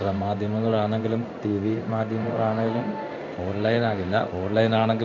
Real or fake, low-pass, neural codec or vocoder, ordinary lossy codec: fake; 7.2 kHz; codec, 16 kHz in and 24 kHz out, 1 kbps, XY-Tokenizer; MP3, 64 kbps